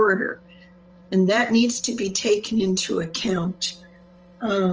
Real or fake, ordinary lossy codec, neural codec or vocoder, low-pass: fake; Opus, 24 kbps; codec, 16 kHz, 8 kbps, FreqCodec, larger model; 7.2 kHz